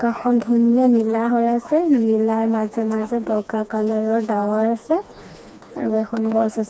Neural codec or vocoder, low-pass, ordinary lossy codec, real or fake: codec, 16 kHz, 2 kbps, FreqCodec, smaller model; none; none; fake